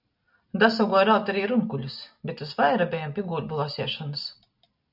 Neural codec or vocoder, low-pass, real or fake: none; 5.4 kHz; real